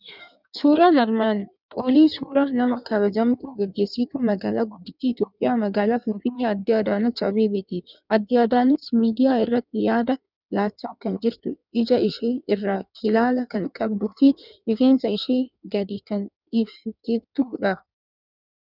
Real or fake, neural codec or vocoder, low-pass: fake; codec, 16 kHz in and 24 kHz out, 1.1 kbps, FireRedTTS-2 codec; 5.4 kHz